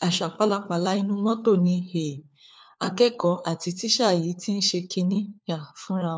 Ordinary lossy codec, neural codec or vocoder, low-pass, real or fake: none; codec, 16 kHz, 4 kbps, FunCodec, trained on LibriTTS, 50 frames a second; none; fake